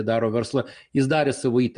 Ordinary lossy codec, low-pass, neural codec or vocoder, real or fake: Opus, 64 kbps; 9.9 kHz; none; real